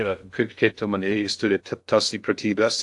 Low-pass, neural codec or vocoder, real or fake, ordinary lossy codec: 10.8 kHz; codec, 16 kHz in and 24 kHz out, 0.6 kbps, FocalCodec, streaming, 2048 codes; fake; AAC, 64 kbps